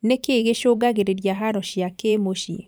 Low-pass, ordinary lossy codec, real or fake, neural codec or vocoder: none; none; fake; vocoder, 44.1 kHz, 128 mel bands every 512 samples, BigVGAN v2